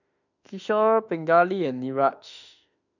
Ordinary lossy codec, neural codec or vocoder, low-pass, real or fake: none; autoencoder, 48 kHz, 32 numbers a frame, DAC-VAE, trained on Japanese speech; 7.2 kHz; fake